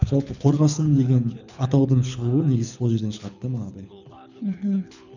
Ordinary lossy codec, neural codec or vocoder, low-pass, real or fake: none; codec, 24 kHz, 6 kbps, HILCodec; 7.2 kHz; fake